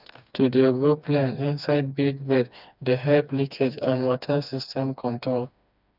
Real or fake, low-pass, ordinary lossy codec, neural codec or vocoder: fake; 5.4 kHz; none; codec, 16 kHz, 2 kbps, FreqCodec, smaller model